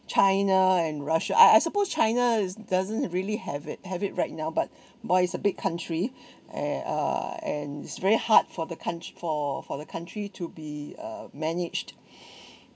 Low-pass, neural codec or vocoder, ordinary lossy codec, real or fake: none; none; none; real